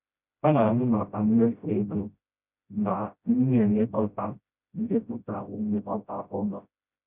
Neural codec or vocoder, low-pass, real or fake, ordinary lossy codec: codec, 16 kHz, 0.5 kbps, FreqCodec, smaller model; 3.6 kHz; fake; none